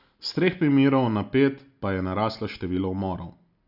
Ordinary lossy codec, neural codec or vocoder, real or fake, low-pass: none; none; real; 5.4 kHz